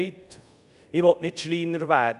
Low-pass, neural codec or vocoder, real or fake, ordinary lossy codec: 10.8 kHz; codec, 24 kHz, 0.5 kbps, DualCodec; fake; none